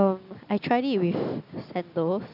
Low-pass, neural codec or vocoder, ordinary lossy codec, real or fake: 5.4 kHz; none; none; real